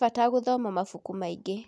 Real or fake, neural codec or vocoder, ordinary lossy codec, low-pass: real; none; none; none